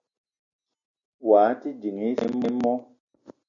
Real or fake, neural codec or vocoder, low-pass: real; none; 7.2 kHz